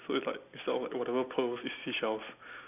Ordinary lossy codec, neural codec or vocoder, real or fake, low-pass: none; none; real; 3.6 kHz